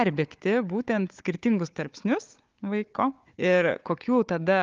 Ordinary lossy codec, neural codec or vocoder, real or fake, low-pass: Opus, 32 kbps; codec, 16 kHz, 4 kbps, FunCodec, trained on Chinese and English, 50 frames a second; fake; 7.2 kHz